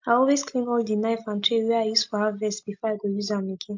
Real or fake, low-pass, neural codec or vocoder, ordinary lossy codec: real; 7.2 kHz; none; MP3, 48 kbps